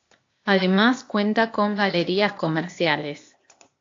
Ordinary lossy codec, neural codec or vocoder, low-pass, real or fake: AAC, 48 kbps; codec, 16 kHz, 0.8 kbps, ZipCodec; 7.2 kHz; fake